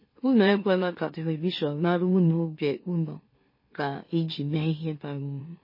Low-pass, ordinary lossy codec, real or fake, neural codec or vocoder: 5.4 kHz; MP3, 24 kbps; fake; autoencoder, 44.1 kHz, a latent of 192 numbers a frame, MeloTTS